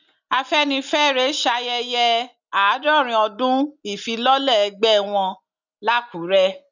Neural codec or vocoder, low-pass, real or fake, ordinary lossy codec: none; 7.2 kHz; real; none